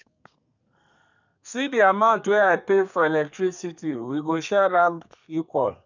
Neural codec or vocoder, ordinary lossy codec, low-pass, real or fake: codec, 32 kHz, 1.9 kbps, SNAC; none; 7.2 kHz; fake